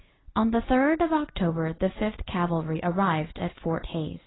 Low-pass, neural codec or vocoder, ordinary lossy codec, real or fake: 7.2 kHz; none; AAC, 16 kbps; real